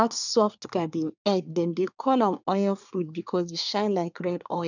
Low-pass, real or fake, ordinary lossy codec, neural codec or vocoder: 7.2 kHz; fake; none; codec, 24 kHz, 1 kbps, SNAC